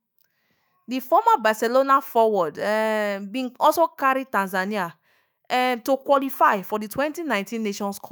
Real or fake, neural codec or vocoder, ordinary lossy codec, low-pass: fake; autoencoder, 48 kHz, 128 numbers a frame, DAC-VAE, trained on Japanese speech; none; none